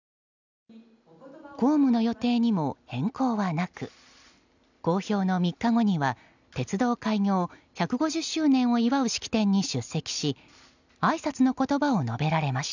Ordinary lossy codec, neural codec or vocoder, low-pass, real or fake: none; none; 7.2 kHz; real